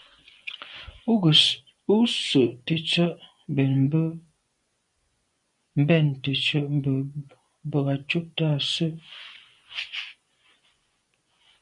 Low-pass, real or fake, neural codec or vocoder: 10.8 kHz; fake; vocoder, 24 kHz, 100 mel bands, Vocos